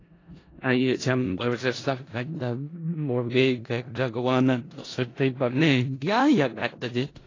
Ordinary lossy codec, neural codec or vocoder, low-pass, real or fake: AAC, 32 kbps; codec, 16 kHz in and 24 kHz out, 0.4 kbps, LongCat-Audio-Codec, four codebook decoder; 7.2 kHz; fake